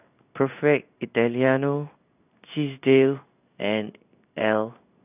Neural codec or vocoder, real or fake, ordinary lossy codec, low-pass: codec, 16 kHz in and 24 kHz out, 1 kbps, XY-Tokenizer; fake; none; 3.6 kHz